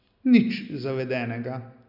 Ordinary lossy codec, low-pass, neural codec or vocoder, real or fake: none; 5.4 kHz; none; real